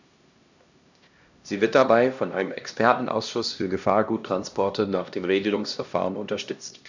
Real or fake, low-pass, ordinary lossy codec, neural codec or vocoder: fake; 7.2 kHz; none; codec, 16 kHz, 1 kbps, X-Codec, HuBERT features, trained on LibriSpeech